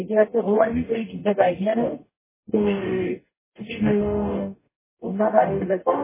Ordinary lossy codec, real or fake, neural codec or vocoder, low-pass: MP3, 16 kbps; fake; codec, 44.1 kHz, 0.9 kbps, DAC; 3.6 kHz